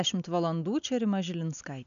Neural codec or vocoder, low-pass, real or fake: none; 7.2 kHz; real